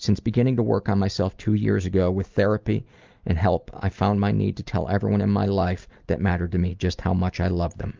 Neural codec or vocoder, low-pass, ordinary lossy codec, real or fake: none; 7.2 kHz; Opus, 32 kbps; real